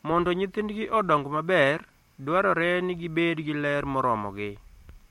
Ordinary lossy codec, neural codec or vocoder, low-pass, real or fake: MP3, 64 kbps; none; 19.8 kHz; real